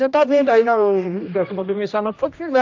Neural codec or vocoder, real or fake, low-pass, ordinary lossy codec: codec, 16 kHz, 1 kbps, X-Codec, HuBERT features, trained on general audio; fake; 7.2 kHz; AAC, 48 kbps